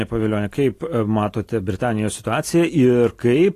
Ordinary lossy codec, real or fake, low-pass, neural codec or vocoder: AAC, 48 kbps; real; 14.4 kHz; none